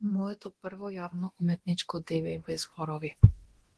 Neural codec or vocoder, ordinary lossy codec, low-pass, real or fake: codec, 24 kHz, 0.9 kbps, DualCodec; Opus, 16 kbps; 10.8 kHz; fake